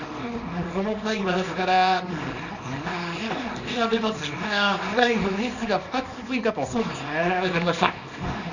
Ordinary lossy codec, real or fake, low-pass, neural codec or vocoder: none; fake; 7.2 kHz; codec, 24 kHz, 0.9 kbps, WavTokenizer, small release